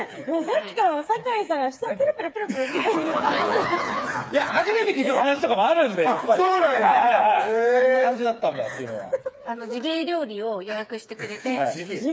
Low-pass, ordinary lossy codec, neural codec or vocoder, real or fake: none; none; codec, 16 kHz, 4 kbps, FreqCodec, smaller model; fake